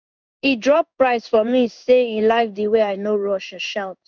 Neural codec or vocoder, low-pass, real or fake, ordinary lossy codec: codec, 16 kHz in and 24 kHz out, 1 kbps, XY-Tokenizer; 7.2 kHz; fake; none